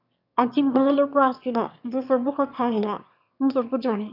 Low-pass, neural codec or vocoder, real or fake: 5.4 kHz; autoencoder, 22.05 kHz, a latent of 192 numbers a frame, VITS, trained on one speaker; fake